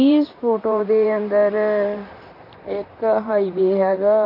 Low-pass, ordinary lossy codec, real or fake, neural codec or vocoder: 5.4 kHz; MP3, 32 kbps; fake; vocoder, 44.1 kHz, 128 mel bands, Pupu-Vocoder